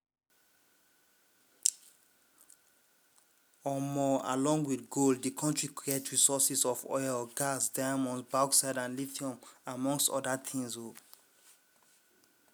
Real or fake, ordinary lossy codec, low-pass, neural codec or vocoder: real; none; none; none